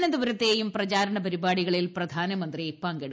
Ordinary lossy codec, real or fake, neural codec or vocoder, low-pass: none; real; none; none